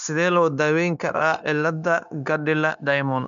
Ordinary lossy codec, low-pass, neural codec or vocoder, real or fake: MP3, 96 kbps; 7.2 kHz; codec, 16 kHz, 0.9 kbps, LongCat-Audio-Codec; fake